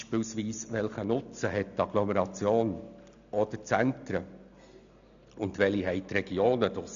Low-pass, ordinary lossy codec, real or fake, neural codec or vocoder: 7.2 kHz; none; real; none